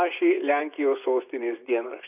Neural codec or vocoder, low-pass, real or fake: vocoder, 24 kHz, 100 mel bands, Vocos; 3.6 kHz; fake